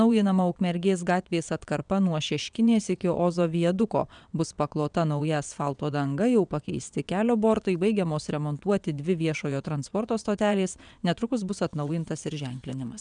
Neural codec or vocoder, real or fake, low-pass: vocoder, 22.05 kHz, 80 mel bands, WaveNeXt; fake; 9.9 kHz